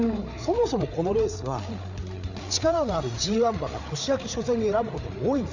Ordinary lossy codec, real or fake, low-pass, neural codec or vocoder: none; fake; 7.2 kHz; codec, 16 kHz, 8 kbps, FreqCodec, larger model